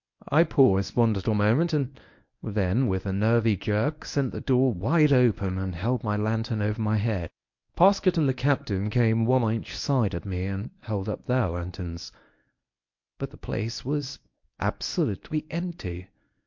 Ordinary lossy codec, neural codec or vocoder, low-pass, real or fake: MP3, 48 kbps; codec, 24 kHz, 0.9 kbps, WavTokenizer, medium speech release version 1; 7.2 kHz; fake